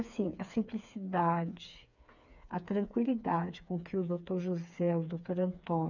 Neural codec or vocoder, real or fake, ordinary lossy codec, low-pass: codec, 16 kHz, 4 kbps, FreqCodec, smaller model; fake; none; 7.2 kHz